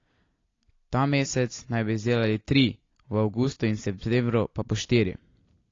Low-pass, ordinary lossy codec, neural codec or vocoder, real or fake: 7.2 kHz; AAC, 32 kbps; none; real